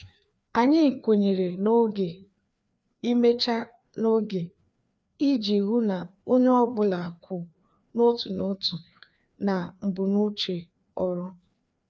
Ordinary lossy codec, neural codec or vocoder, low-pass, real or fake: none; codec, 16 kHz, 2 kbps, FreqCodec, larger model; none; fake